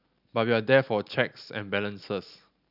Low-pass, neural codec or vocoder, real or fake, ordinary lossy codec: 5.4 kHz; none; real; none